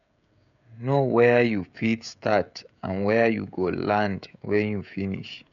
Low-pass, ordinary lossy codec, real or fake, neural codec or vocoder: 7.2 kHz; none; fake; codec, 16 kHz, 16 kbps, FreqCodec, smaller model